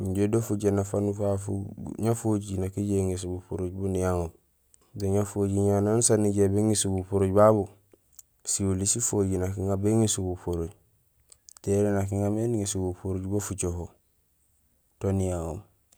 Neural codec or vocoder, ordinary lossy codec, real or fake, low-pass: none; none; real; none